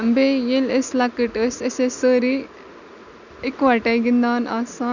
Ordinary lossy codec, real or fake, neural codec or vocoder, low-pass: none; real; none; 7.2 kHz